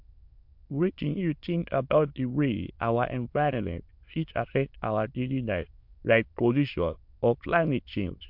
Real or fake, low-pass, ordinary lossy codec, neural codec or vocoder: fake; 5.4 kHz; MP3, 48 kbps; autoencoder, 22.05 kHz, a latent of 192 numbers a frame, VITS, trained on many speakers